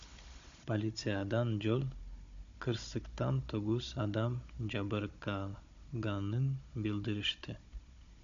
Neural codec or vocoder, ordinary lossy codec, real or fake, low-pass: codec, 16 kHz, 16 kbps, FunCodec, trained on Chinese and English, 50 frames a second; AAC, 64 kbps; fake; 7.2 kHz